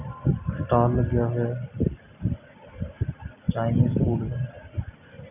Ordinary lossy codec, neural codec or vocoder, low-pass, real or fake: none; none; 3.6 kHz; real